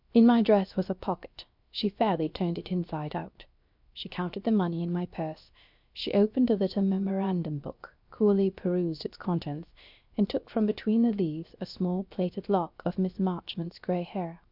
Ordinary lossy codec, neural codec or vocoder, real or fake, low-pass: MP3, 48 kbps; codec, 16 kHz, 0.7 kbps, FocalCodec; fake; 5.4 kHz